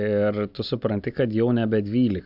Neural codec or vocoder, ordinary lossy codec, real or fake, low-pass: none; AAC, 48 kbps; real; 5.4 kHz